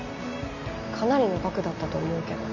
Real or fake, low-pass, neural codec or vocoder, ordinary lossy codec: real; 7.2 kHz; none; none